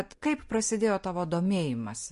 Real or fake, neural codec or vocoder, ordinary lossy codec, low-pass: real; none; MP3, 48 kbps; 14.4 kHz